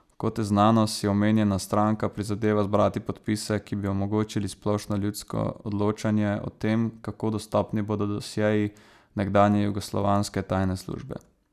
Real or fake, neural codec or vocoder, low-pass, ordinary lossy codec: real; none; 14.4 kHz; none